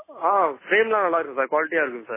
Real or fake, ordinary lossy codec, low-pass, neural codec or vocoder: real; MP3, 16 kbps; 3.6 kHz; none